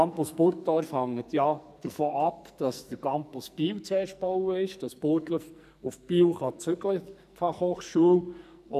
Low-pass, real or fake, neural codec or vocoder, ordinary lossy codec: 14.4 kHz; fake; codec, 44.1 kHz, 2.6 kbps, SNAC; none